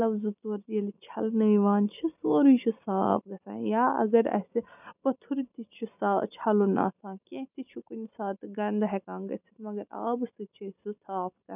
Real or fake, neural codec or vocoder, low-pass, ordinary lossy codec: real; none; 3.6 kHz; none